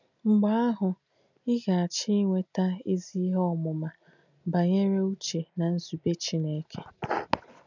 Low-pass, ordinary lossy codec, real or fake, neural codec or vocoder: 7.2 kHz; none; real; none